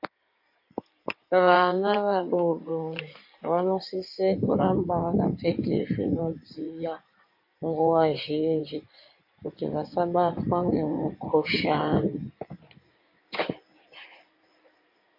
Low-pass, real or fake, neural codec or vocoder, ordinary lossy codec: 5.4 kHz; fake; codec, 16 kHz in and 24 kHz out, 2.2 kbps, FireRedTTS-2 codec; MP3, 32 kbps